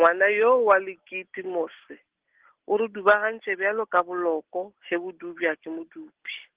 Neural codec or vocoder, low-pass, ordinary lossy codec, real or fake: none; 3.6 kHz; Opus, 16 kbps; real